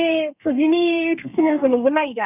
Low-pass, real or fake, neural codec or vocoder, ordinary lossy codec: 3.6 kHz; fake; codec, 32 kHz, 1.9 kbps, SNAC; none